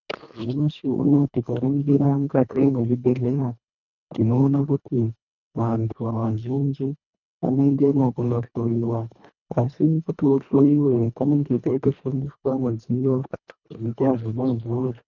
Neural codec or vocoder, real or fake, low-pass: codec, 24 kHz, 1.5 kbps, HILCodec; fake; 7.2 kHz